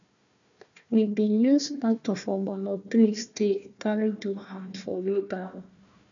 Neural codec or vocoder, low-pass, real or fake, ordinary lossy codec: codec, 16 kHz, 1 kbps, FunCodec, trained on Chinese and English, 50 frames a second; 7.2 kHz; fake; none